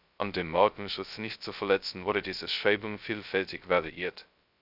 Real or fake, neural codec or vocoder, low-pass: fake; codec, 16 kHz, 0.2 kbps, FocalCodec; 5.4 kHz